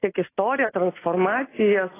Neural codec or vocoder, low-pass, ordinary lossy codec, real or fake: none; 3.6 kHz; AAC, 16 kbps; real